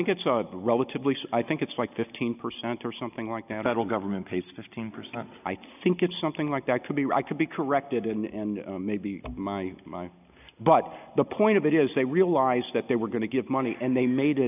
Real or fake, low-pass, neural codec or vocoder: real; 3.6 kHz; none